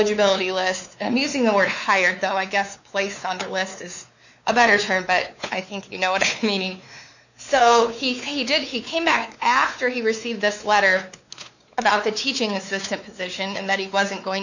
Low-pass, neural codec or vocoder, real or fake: 7.2 kHz; codec, 16 kHz, 4 kbps, X-Codec, WavLM features, trained on Multilingual LibriSpeech; fake